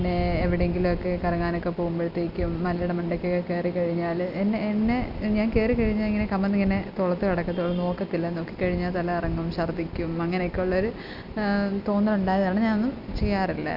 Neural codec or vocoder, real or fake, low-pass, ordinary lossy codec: none; real; 5.4 kHz; none